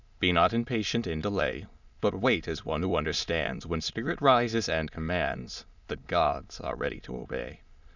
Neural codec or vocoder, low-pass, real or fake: autoencoder, 22.05 kHz, a latent of 192 numbers a frame, VITS, trained on many speakers; 7.2 kHz; fake